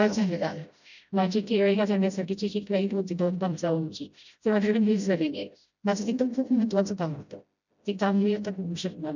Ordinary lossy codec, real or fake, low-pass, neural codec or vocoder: none; fake; 7.2 kHz; codec, 16 kHz, 0.5 kbps, FreqCodec, smaller model